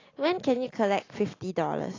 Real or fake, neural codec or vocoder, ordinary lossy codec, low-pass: real; none; AAC, 32 kbps; 7.2 kHz